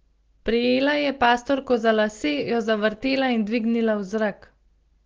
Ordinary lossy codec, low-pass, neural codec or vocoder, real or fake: Opus, 16 kbps; 7.2 kHz; none; real